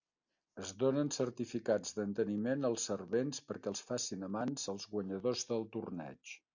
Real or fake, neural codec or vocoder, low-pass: real; none; 7.2 kHz